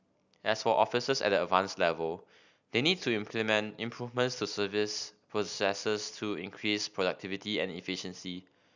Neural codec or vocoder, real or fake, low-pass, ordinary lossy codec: none; real; 7.2 kHz; none